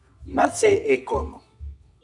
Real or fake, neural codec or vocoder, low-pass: fake; codec, 24 kHz, 0.9 kbps, WavTokenizer, medium music audio release; 10.8 kHz